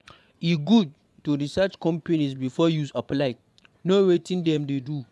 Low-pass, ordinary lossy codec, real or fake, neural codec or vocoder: none; none; fake; vocoder, 24 kHz, 100 mel bands, Vocos